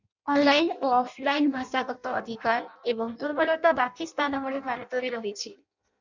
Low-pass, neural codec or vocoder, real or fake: 7.2 kHz; codec, 16 kHz in and 24 kHz out, 0.6 kbps, FireRedTTS-2 codec; fake